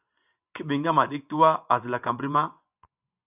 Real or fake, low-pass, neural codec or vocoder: fake; 3.6 kHz; vocoder, 24 kHz, 100 mel bands, Vocos